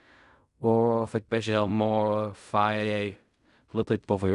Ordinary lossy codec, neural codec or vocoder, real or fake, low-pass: none; codec, 16 kHz in and 24 kHz out, 0.4 kbps, LongCat-Audio-Codec, fine tuned four codebook decoder; fake; 10.8 kHz